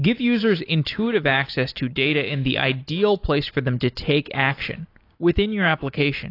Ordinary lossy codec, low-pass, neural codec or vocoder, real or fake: AAC, 32 kbps; 5.4 kHz; none; real